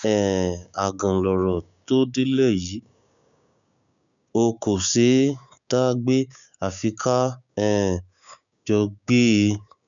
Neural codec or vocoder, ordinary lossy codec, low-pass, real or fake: codec, 16 kHz, 6 kbps, DAC; none; 7.2 kHz; fake